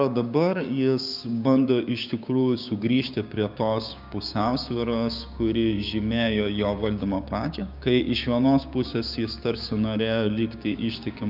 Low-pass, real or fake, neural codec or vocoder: 5.4 kHz; fake; codec, 16 kHz, 6 kbps, DAC